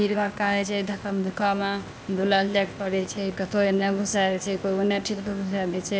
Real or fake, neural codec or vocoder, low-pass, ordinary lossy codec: fake; codec, 16 kHz, 0.8 kbps, ZipCodec; none; none